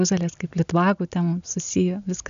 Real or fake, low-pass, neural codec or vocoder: real; 7.2 kHz; none